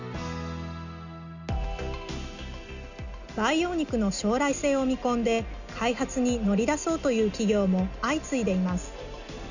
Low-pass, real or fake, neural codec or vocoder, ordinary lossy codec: 7.2 kHz; real; none; none